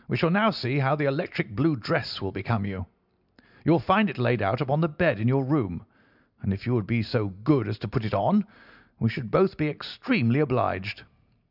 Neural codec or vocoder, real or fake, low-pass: none; real; 5.4 kHz